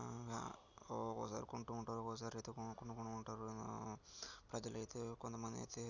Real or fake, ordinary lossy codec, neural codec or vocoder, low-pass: real; none; none; 7.2 kHz